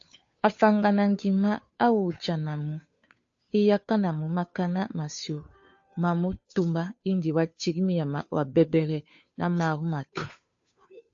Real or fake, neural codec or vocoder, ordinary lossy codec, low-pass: fake; codec, 16 kHz, 2 kbps, FunCodec, trained on Chinese and English, 25 frames a second; AAC, 64 kbps; 7.2 kHz